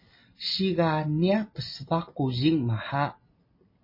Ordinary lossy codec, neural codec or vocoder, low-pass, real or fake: MP3, 24 kbps; none; 5.4 kHz; real